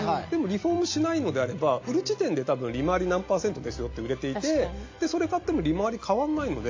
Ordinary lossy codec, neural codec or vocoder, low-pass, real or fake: AAC, 48 kbps; none; 7.2 kHz; real